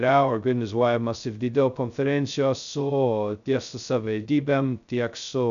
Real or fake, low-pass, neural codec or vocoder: fake; 7.2 kHz; codec, 16 kHz, 0.2 kbps, FocalCodec